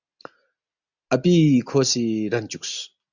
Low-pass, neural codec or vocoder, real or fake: 7.2 kHz; none; real